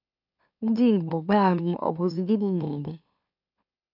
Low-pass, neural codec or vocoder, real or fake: 5.4 kHz; autoencoder, 44.1 kHz, a latent of 192 numbers a frame, MeloTTS; fake